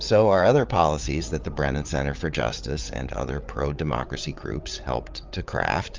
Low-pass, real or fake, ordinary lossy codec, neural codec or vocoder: 7.2 kHz; fake; Opus, 24 kbps; codec, 16 kHz, 8 kbps, FreqCodec, larger model